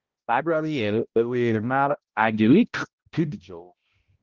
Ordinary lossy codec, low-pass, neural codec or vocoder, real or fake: Opus, 16 kbps; 7.2 kHz; codec, 16 kHz, 0.5 kbps, X-Codec, HuBERT features, trained on balanced general audio; fake